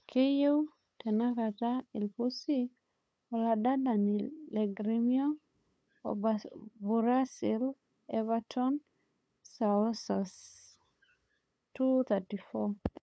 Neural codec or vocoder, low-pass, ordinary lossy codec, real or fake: codec, 16 kHz, 8 kbps, FunCodec, trained on LibriTTS, 25 frames a second; none; none; fake